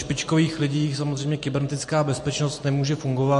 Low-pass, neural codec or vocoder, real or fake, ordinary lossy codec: 14.4 kHz; none; real; MP3, 48 kbps